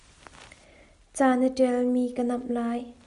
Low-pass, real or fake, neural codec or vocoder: 10.8 kHz; real; none